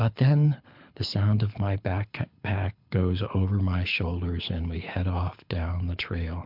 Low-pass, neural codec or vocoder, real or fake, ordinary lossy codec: 5.4 kHz; codec, 24 kHz, 6 kbps, HILCodec; fake; MP3, 48 kbps